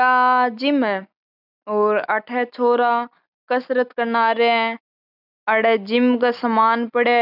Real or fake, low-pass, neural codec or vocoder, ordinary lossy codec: real; 5.4 kHz; none; none